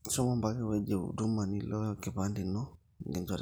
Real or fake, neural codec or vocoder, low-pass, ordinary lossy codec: real; none; none; none